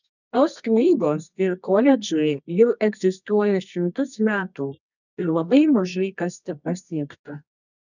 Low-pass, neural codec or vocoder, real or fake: 7.2 kHz; codec, 24 kHz, 0.9 kbps, WavTokenizer, medium music audio release; fake